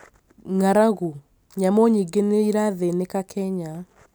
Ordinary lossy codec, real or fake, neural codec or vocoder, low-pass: none; real; none; none